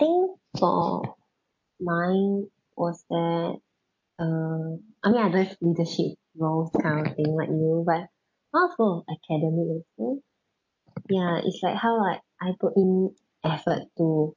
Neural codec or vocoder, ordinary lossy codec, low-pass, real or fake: none; MP3, 48 kbps; 7.2 kHz; real